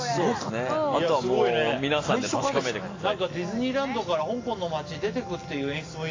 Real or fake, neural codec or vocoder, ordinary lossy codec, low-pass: real; none; AAC, 32 kbps; 7.2 kHz